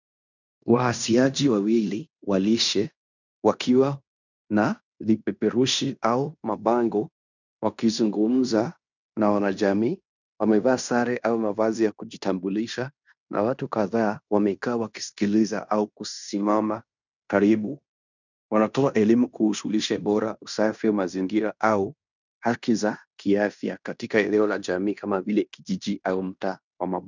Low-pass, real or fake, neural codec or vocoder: 7.2 kHz; fake; codec, 16 kHz in and 24 kHz out, 0.9 kbps, LongCat-Audio-Codec, fine tuned four codebook decoder